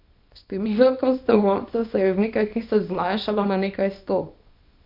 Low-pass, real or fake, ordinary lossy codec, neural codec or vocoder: 5.4 kHz; fake; MP3, 48 kbps; codec, 24 kHz, 0.9 kbps, WavTokenizer, small release